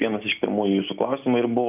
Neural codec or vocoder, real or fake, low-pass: none; real; 3.6 kHz